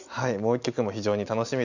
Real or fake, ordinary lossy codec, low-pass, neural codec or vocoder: real; none; 7.2 kHz; none